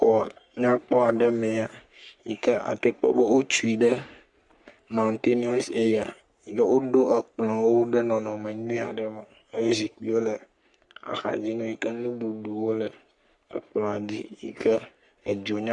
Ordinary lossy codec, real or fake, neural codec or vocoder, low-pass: Opus, 64 kbps; fake; codec, 44.1 kHz, 3.4 kbps, Pupu-Codec; 10.8 kHz